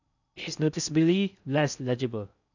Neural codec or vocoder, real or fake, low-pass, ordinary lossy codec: codec, 16 kHz in and 24 kHz out, 0.6 kbps, FocalCodec, streaming, 4096 codes; fake; 7.2 kHz; none